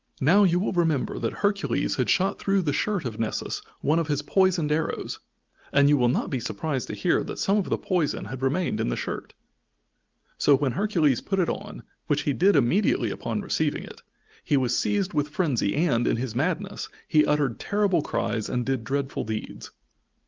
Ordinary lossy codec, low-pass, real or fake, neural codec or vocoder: Opus, 32 kbps; 7.2 kHz; real; none